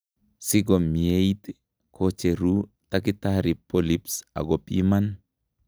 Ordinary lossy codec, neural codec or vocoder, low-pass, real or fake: none; none; none; real